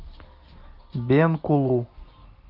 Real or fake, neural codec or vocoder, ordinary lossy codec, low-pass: real; none; Opus, 32 kbps; 5.4 kHz